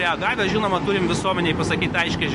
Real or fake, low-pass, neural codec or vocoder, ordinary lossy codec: real; 14.4 kHz; none; MP3, 48 kbps